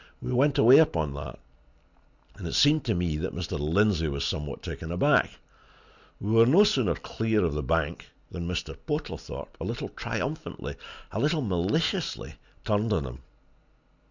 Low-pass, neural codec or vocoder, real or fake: 7.2 kHz; none; real